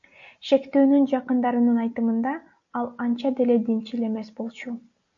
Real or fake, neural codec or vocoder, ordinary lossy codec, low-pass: real; none; AAC, 64 kbps; 7.2 kHz